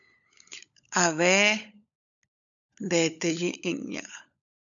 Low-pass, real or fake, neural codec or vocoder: 7.2 kHz; fake; codec, 16 kHz, 16 kbps, FunCodec, trained on LibriTTS, 50 frames a second